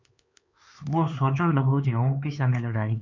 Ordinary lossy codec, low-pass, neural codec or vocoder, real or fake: MP3, 48 kbps; 7.2 kHz; codec, 16 kHz, 4 kbps, X-Codec, HuBERT features, trained on LibriSpeech; fake